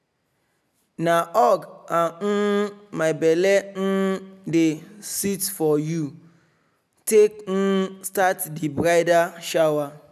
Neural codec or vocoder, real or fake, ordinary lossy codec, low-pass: none; real; none; 14.4 kHz